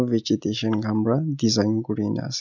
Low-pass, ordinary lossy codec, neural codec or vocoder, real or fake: 7.2 kHz; none; none; real